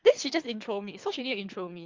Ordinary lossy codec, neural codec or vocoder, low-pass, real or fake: Opus, 24 kbps; codec, 24 kHz, 3 kbps, HILCodec; 7.2 kHz; fake